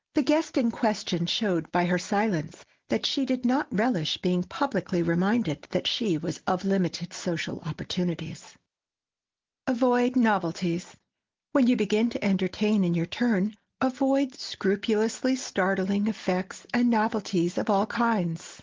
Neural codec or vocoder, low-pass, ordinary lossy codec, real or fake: none; 7.2 kHz; Opus, 16 kbps; real